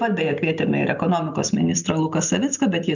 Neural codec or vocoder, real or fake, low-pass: none; real; 7.2 kHz